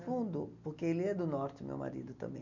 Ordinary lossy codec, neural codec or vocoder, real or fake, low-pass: none; none; real; 7.2 kHz